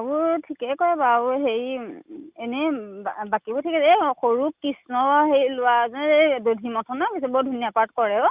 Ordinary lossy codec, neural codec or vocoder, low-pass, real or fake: Opus, 64 kbps; none; 3.6 kHz; real